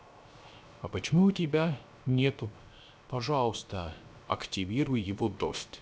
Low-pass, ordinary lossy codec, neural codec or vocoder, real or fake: none; none; codec, 16 kHz, 0.3 kbps, FocalCodec; fake